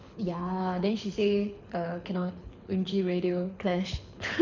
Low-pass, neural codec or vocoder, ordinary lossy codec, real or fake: 7.2 kHz; codec, 24 kHz, 6 kbps, HILCodec; none; fake